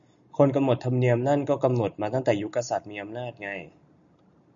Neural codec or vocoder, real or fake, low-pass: none; real; 7.2 kHz